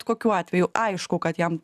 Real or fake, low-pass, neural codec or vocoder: real; 14.4 kHz; none